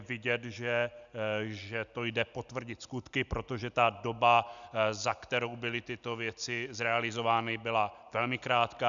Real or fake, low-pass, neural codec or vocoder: real; 7.2 kHz; none